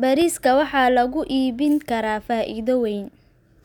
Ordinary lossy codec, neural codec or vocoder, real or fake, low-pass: none; none; real; 19.8 kHz